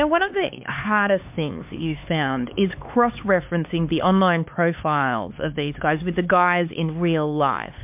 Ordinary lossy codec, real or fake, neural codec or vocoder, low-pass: MP3, 32 kbps; fake; codec, 16 kHz, 4 kbps, X-Codec, HuBERT features, trained on LibriSpeech; 3.6 kHz